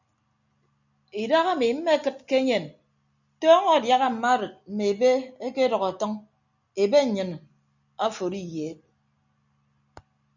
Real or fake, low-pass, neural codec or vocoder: real; 7.2 kHz; none